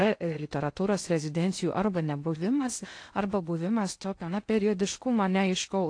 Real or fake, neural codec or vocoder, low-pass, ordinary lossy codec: fake; codec, 16 kHz in and 24 kHz out, 0.6 kbps, FocalCodec, streaming, 2048 codes; 9.9 kHz; AAC, 48 kbps